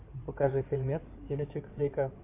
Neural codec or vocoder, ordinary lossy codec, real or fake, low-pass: codec, 16 kHz in and 24 kHz out, 2.2 kbps, FireRedTTS-2 codec; AAC, 24 kbps; fake; 3.6 kHz